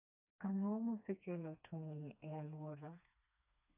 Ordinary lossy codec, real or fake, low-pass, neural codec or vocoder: none; fake; 3.6 kHz; codec, 16 kHz, 2 kbps, FreqCodec, smaller model